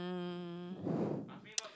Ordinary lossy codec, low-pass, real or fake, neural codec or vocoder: none; none; real; none